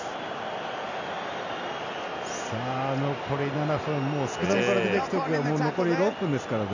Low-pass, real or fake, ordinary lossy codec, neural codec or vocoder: 7.2 kHz; real; none; none